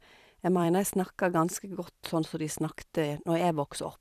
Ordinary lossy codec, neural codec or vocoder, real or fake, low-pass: none; vocoder, 44.1 kHz, 128 mel bands every 256 samples, BigVGAN v2; fake; 14.4 kHz